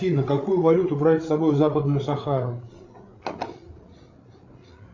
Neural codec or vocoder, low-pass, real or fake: codec, 16 kHz, 8 kbps, FreqCodec, larger model; 7.2 kHz; fake